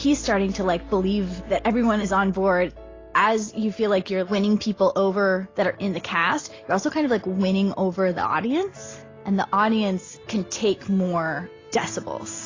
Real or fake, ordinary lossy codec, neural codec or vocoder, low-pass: real; AAC, 32 kbps; none; 7.2 kHz